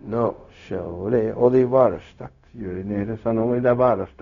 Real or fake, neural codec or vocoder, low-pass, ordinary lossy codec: fake; codec, 16 kHz, 0.4 kbps, LongCat-Audio-Codec; 7.2 kHz; none